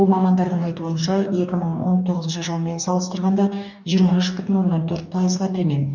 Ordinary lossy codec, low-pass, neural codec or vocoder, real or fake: none; 7.2 kHz; codec, 44.1 kHz, 2.6 kbps, DAC; fake